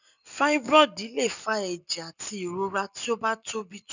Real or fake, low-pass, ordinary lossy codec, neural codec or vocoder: real; 7.2 kHz; none; none